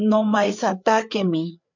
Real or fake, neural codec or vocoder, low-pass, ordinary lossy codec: fake; codec, 16 kHz, 4 kbps, FreqCodec, larger model; 7.2 kHz; MP3, 64 kbps